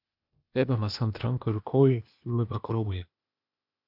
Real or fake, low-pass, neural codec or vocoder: fake; 5.4 kHz; codec, 16 kHz, 0.8 kbps, ZipCodec